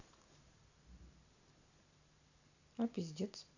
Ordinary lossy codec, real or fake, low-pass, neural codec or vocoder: none; real; 7.2 kHz; none